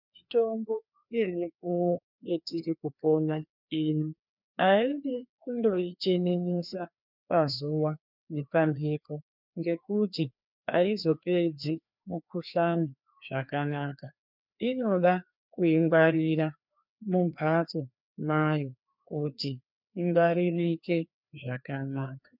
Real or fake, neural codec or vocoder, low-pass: fake; codec, 16 kHz, 2 kbps, FreqCodec, larger model; 5.4 kHz